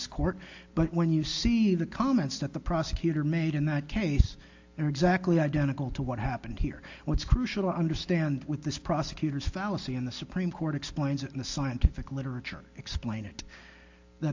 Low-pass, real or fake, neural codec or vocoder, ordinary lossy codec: 7.2 kHz; real; none; AAC, 48 kbps